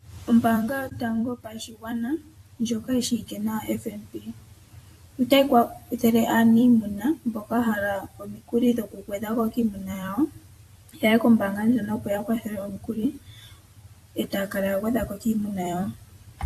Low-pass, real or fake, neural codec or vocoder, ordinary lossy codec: 14.4 kHz; fake; vocoder, 44.1 kHz, 128 mel bands every 256 samples, BigVGAN v2; AAC, 64 kbps